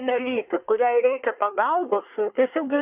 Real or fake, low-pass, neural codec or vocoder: fake; 3.6 kHz; codec, 24 kHz, 1 kbps, SNAC